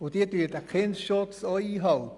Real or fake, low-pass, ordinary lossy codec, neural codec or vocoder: real; 10.8 kHz; Opus, 64 kbps; none